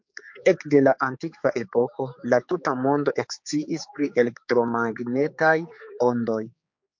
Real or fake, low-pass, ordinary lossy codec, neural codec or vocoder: fake; 7.2 kHz; MP3, 48 kbps; codec, 16 kHz, 4 kbps, X-Codec, HuBERT features, trained on general audio